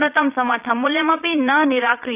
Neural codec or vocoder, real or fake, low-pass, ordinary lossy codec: vocoder, 22.05 kHz, 80 mel bands, Vocos; fake; 3.6 kHz; AAC, 32 kbps